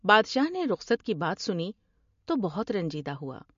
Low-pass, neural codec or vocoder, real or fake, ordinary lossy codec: 7.2 kHz; none; real; MP3, 48 kbps